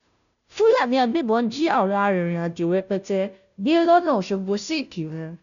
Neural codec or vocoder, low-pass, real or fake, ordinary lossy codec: codec, 16 kHz, 0.5 kbps, FunCodec, trained on Chinese and English, 25 frames a second; 7.2 kHz; fake; none